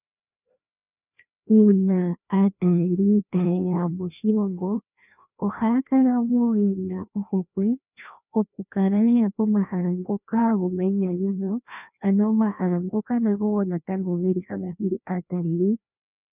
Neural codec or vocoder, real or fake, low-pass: codec, 16 kHz, 1 kbps, FreqCodec, larger model; fake; 3.6 kHz